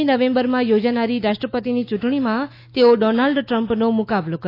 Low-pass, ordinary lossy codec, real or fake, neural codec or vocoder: 5.4 kHz; AAC, 32 kbps; fake; autoencoder, 48 kHz, 128 numbers a frame, DAC-VAE, trained on Japanese speech